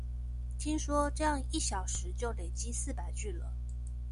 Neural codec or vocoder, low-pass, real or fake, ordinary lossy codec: none; 10.8 kHz; real; AAC, 96 kbps